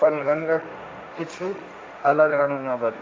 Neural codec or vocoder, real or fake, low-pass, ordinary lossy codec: codec, 16 kHz, 1.1 kbps, Voila-Tokenizer; fake; none; none